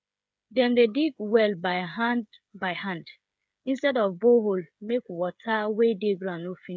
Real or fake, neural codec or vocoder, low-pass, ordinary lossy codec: fake; codec, 16 kHz, 8 kbps, FreqCodec, smaller model; none; none